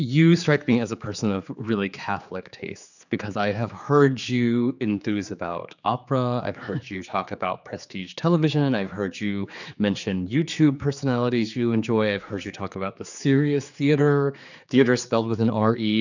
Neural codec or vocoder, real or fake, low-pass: codec, 16 kHz, 4 kbps, X-Codec, HuBERT features, trained on general audio; fake; 7.2 kHz